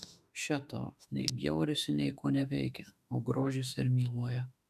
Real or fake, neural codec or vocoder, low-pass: fake; autoencoder, 48 kHz, 32 numbers a frame, DAC-VAE, trained on Japanese speech; 14.4 kHz